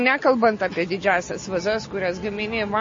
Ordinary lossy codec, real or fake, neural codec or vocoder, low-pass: MP3, 32 kbps; real; none; 7.2 kHz